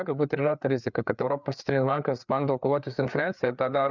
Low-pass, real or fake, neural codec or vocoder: 7.2 kHz; fake; codec, 16 kHz, 4 kbps, FreqCodec, larger model